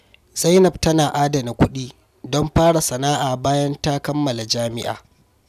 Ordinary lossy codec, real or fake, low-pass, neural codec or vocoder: none; real; 14.4 kHz; none